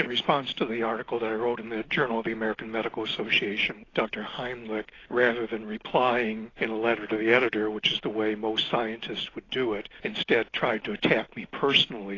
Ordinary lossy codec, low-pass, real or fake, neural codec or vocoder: AAC, 32 kbps; 7.2 kHz; real; none